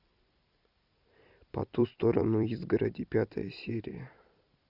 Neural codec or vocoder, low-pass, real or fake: vocoder, 44.1 kHz, 128 mel bands every 256 samples, BigVGAN v2; 5.4 kHz; fake